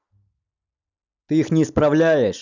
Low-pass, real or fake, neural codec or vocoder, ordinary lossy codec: 7.2 kHz; real; none; none